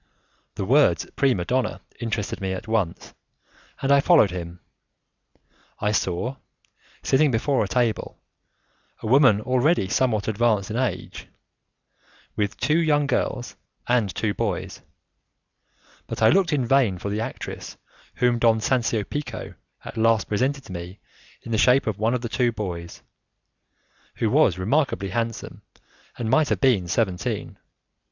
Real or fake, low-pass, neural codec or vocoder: real; 7.2 kHz; none